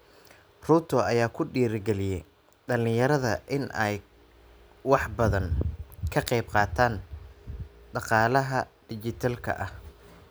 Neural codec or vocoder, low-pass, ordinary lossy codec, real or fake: none; none; none; real